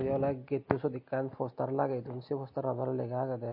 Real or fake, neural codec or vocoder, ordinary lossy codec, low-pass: real; none; MP3, 32 kbps; 5.4 kHz